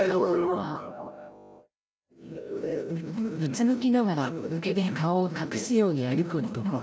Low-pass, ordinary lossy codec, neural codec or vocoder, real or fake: none; none; codec, 16 kHz, 0.5 kbps, FreqCodec, larger model; fake